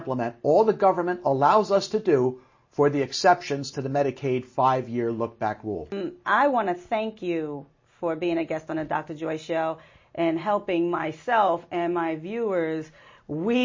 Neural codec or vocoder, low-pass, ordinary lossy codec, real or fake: none; 7.2 kHz; MP3, 32 kbps; real